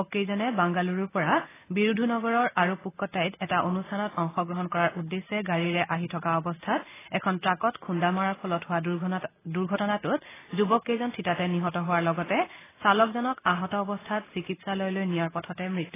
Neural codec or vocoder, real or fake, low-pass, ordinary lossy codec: none; real; 3.6 kHz; AAC, 16 kbps